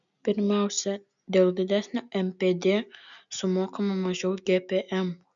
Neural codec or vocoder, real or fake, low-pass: none; real; 7.2 kHz